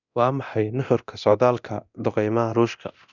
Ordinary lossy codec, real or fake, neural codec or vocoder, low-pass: none; fake; codec, 24 kHz, 0.9 kbps, DualCodec; 7.2 kHz